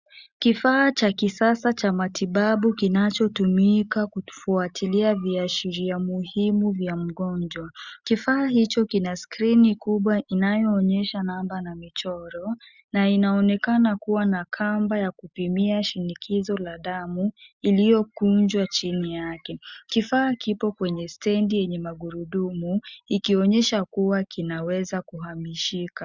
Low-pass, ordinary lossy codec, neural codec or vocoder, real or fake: 7.2 kHz; Opus, 64 kbps; none; real